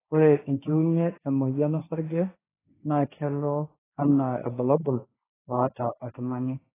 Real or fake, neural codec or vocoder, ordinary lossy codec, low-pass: fake; codec, 16 kHz, 1.1 kbps, Voila-Tokenizer; AAC, 16 kbps; 3.6 kHz